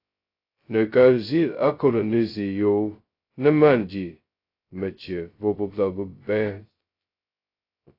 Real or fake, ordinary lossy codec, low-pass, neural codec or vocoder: fake; AAC, 32 kbps; 5.4 kHz; codec, 16 kHz, 0.2 kbps, FocalCodec